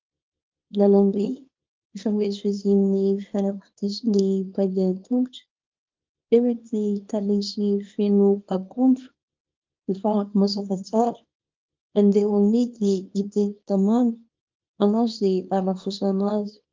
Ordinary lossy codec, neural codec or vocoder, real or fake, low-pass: Opus, 32 kbps; codec, 24 kHz, 0.9 kbps, WavTokenizer, small release; fake; 7.2 kHz